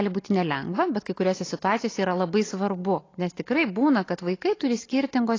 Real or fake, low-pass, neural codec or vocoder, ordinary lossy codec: real; 7.2 kHz; none; AAC, 32 kbps